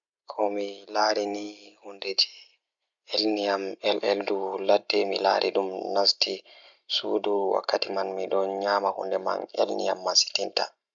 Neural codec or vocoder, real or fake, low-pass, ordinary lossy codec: none; real; 7.2 kHz; none